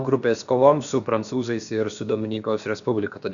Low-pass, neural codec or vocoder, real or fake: 7.2 kHz; codec, 16 kHz, about 1 kbps, DyCAST, with the encoder's durations; fake